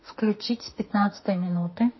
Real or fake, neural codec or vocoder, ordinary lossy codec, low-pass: fake; autoencoder, 48 kHz, 32 numbers a frame, DAC-VAE, trained on Japanese speech; MP3, 24 kbps; 7.2 kHz